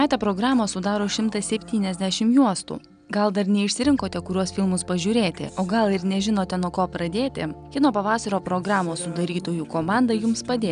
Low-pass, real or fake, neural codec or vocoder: 9.9 kHz; real; none